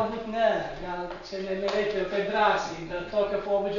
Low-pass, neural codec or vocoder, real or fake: 7.2 kHz; none; real